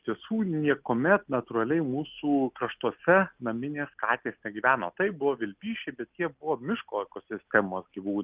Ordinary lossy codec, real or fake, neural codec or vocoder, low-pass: Opus, 24 kbps; real; none; 3.6 kHz